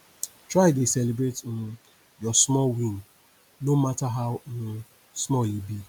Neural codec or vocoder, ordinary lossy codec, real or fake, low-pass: none; none; real; none